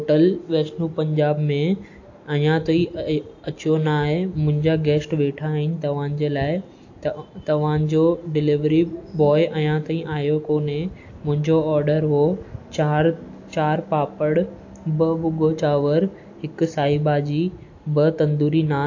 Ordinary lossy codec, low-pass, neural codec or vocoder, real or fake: AAC, 48 kbps; 7.2 kHz; none; real